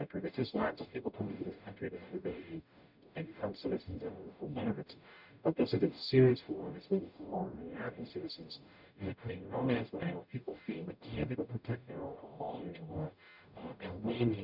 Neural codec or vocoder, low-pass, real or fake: codec, 44.1 kHz, 0.9 kbps, DAC; 5.4 kHz; fake